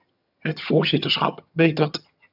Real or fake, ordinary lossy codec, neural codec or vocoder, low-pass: fake; AAC, 48 kbps; vocoder, 22.05 kHz, 80 mel bands, HiFi-GAN; 5.4 kHz